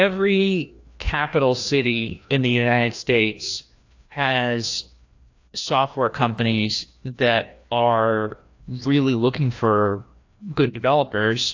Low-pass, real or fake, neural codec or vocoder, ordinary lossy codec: 7.2 kHz; fake; codec, 16 kHz, 1 kbps, FreqCodec, larger model; AAC, 48 kbps